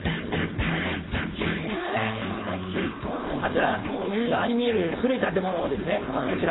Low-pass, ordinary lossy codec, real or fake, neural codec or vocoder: 7.2 kHz; AAC, 16 kbps; fake; codec, 16 kHz, 4.8 kbps, FACodec